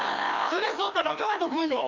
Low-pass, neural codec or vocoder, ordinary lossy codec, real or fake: 7.2 kHz; codec, 16 kHz, 1 kbps, FreqCodec, larger model; none; fake